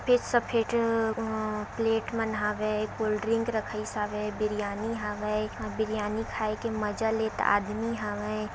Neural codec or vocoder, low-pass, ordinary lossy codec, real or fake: none; none; none; real